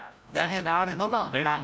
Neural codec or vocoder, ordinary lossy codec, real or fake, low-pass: codec, 16 kHz, 0.5 kbps, FreqCodec, larger model; none; fake; none